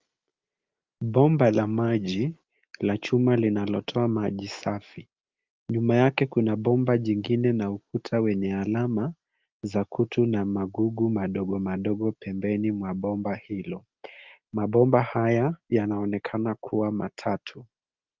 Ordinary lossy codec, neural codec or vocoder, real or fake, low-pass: Opus, 24 kbps; none; real; 7.2 kHz